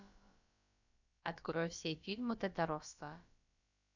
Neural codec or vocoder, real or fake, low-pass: codec, 16 kHz, about 1 kbps, DyCAST, with the encoder's durations; fake; 7.2 kHz